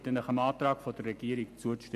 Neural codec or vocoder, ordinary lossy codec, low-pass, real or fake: none; none; 14.4 kHz; real